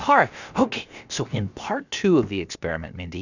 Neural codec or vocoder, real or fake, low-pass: codec, 16 kHz, about 1 kbps, DyCAST, with the encoder's durations; fake; 7.2 kHz